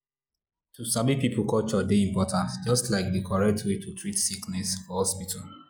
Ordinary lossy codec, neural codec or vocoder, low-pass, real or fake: none; none; none; real